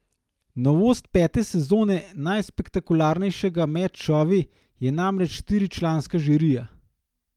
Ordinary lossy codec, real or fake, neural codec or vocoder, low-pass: Opus, 32 kbps; real; none; 19.8 kHz